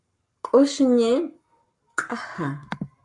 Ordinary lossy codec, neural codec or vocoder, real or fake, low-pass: MP3, 64 kbps; codec, 44.1 kHz, 7.8 kbps, Pupu-Codec; fake; 10.8 kHz